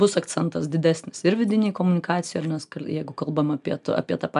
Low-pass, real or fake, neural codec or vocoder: 10.8 kHz; real; none